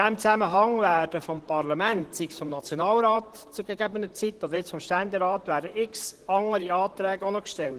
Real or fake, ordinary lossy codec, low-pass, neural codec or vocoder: fake; Opus, 16 kbps; 14.4 kHz; vocoder, 44.1 kHz, 128 mel bands, Pupu-Vocoder